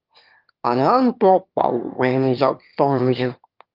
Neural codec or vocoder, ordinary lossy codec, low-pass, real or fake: autoencoder, 22.05 kHz, a latent of 192 numbers a frame, VITS, trained on one speaker; Opus, 32 kbps; 5.4 kHz; fake